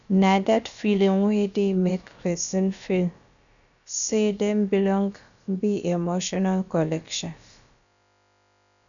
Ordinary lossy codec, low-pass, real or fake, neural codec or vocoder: none; 7.2 kHz; fake; codec, 16 kHz, about 1 kbps, DyCAST, with the encoder's durations